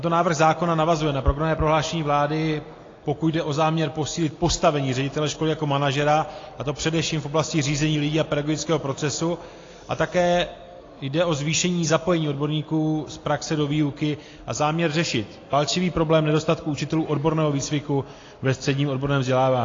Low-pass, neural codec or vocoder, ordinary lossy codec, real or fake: 7.2 kHz; none; AAC, 32 kbps; real